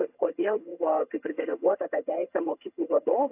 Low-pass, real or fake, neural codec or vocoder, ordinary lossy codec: 3.6 kHz; fake; vocoder, 22.05 kHz, 80 mel bands, HiFi-GAN; AAC, 32 kbps